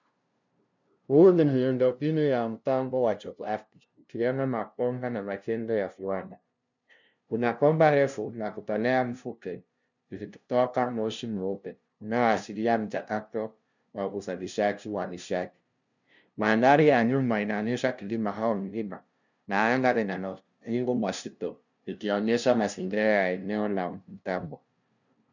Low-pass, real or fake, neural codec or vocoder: 7.2 kHz; fake; codec, 16 kHz, 0.5 kbps, FunCodec, trained on LibriTTS, 25 frames a second